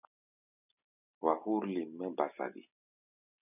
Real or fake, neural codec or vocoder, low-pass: real; none; 3.6 kHz